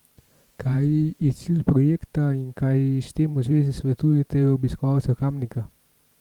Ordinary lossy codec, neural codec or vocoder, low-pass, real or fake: Opus, 32 kbps; vocoder, 44.1 kHz, 128 mel bands every 512 samples, BigVGAN v2; 19.8 kHz; fake